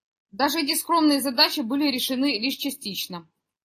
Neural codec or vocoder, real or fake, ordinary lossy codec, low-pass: none; real; AAC, 48 kbps; 14.4 kHz